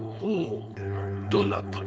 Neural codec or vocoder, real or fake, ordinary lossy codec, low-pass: codec, 16 kHz, 4.8 kbps, FACodec; fake; none; none